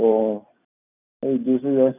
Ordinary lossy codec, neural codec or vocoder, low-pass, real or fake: none; none; 3.6 kHz; real